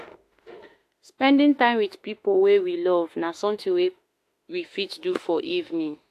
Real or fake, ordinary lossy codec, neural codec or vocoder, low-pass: fake; AAC, 64 kbps; autoencoder, 48 kHz, 32 numbers a frame, DAC-VAE, trained on Japanese speech; 14.4 kHz